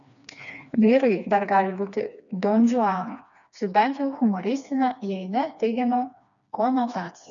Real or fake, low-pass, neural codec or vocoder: fake; 7.2 kHz; codec, 16 kHz, 2 kbps, FreqCodec, smaller model